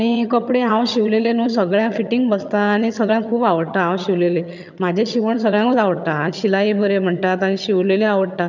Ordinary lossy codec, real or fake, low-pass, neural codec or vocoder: none; fake; 7.2 kHz; vocoder, 22.05 kHz, 80 mel bands, HiFi-GAN